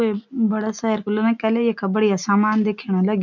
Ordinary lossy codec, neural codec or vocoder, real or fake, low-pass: none; none; real; 7.2 kHz